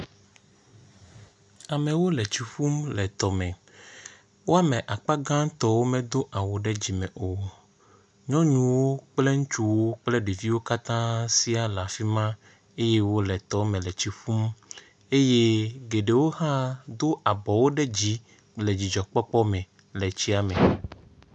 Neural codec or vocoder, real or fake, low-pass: none; real; 10.8 kHz